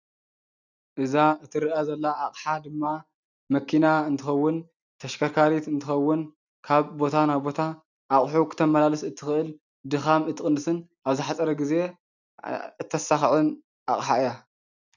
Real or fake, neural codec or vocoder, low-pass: real; none; 7.2 kHz